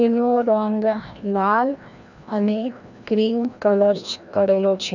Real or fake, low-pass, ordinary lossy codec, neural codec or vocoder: fake; 7.2 kHz; none; codec, 16 kHz, 1 kbps, FreqCodec, larger model